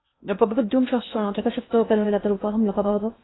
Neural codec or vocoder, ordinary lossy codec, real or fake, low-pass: codec, 16 kHz in and 24 kHz out, 0.6 kbps, FocalCodec, streaming, 2048 codes; AAC, 16 kbps; fake; 7.2 kHz